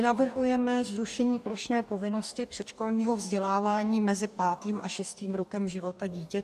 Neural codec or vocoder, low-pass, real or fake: codec, 44.1 kHz, 2.6 kbps, DAC; 14.4 kHz; fake